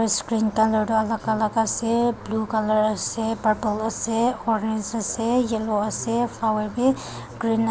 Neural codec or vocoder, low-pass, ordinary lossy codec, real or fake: none; none; none; real